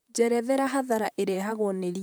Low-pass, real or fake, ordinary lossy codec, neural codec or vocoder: none; fake; none; vocoder, 44.1 kHz, 128 mel bands, Pupu-Vocoder